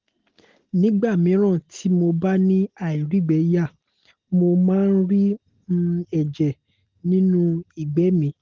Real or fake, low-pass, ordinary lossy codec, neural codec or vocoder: real; 7.2 kHz; Opus, 16 kbps; none